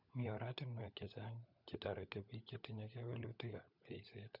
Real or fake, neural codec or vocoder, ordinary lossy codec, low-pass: fake; codec, 16 kHz, 16 kbps, FunCodec, trained on LibriTTS, 50 frames a second; none; 5.4 kHz